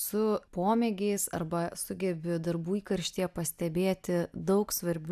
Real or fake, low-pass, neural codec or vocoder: real; 14.4 kHz; none